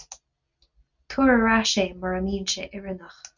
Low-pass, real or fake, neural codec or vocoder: 7.2 kHz; real; none